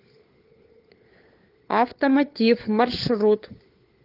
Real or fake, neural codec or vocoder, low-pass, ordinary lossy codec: fake; codec, 16 kHz, 4 kbps, FunCodec, trained on LibriTTS, 50 frames a second; 5.4 kHz; Opus, 24 kbps